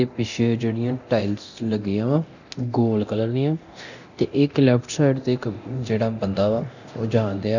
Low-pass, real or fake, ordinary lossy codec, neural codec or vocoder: 7.2 kHz; fake; none; codec, 24 kHz, 0.9 kbps, DualCodec